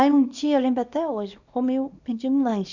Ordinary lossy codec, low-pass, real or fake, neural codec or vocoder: none; 7.2 kHz; fake; codec, 24 kHz, 0.9 kbps, WavTokenizer, small release